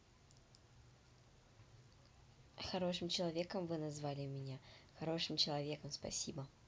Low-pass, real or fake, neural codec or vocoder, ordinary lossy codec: none; real; none; none